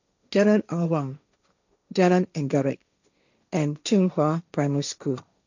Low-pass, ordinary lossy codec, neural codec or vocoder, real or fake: 7.2 kHz; none; codec, 16 kHz, 1.1 kbps, Voila-Tokenizer; fake